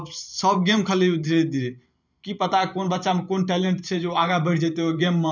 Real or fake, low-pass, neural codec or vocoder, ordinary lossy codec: fake; 7.2 kHz; vocoder, 44.1 kHz, 128 mel bands every 512 samples, BigVGAN v2; none